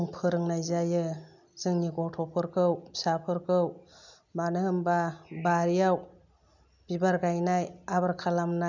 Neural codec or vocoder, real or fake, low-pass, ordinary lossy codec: none; real; 7.2 kHz; none